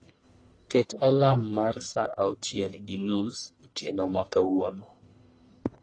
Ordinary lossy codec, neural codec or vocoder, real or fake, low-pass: AAC, 32 kbps; codec, 44.1 kHz, 1.7 kbps, Pupu-Codec; fake; 9.9 kHz